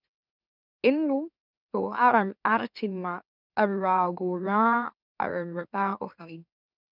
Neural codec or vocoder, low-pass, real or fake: autoencoder, 44.1 kHz, a latent of 192 numbers a frame, MeloTTS; 5.4 kHz; fake